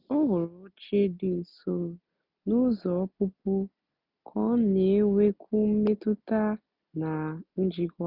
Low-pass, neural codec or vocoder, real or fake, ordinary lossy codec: 5.4 kHz; none; real; none